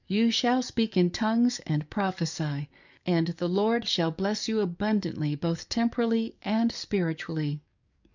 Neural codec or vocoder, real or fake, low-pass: codec, 44.1 kHz, 7.8 kbps, DAC; fake; 7.2 kHz